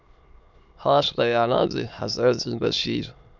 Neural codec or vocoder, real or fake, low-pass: autoencoder, 22.05 kHz, a latent of 192 numbers a frame, VITS, trained on many speakers; fake; 7.2 kHz